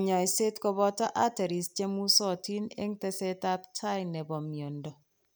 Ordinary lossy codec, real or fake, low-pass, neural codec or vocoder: none; real; none; none